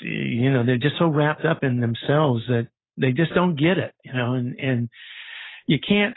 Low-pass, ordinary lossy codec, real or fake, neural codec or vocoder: 7.2 kHz; AAC, 16 kbps; real; none